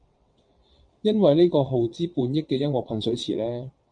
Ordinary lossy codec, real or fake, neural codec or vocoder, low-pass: MP3, 64 kbps; fake; vocoder, 22.05 kHz, 80 mel bands, WaveNeXt; 9.9 kHz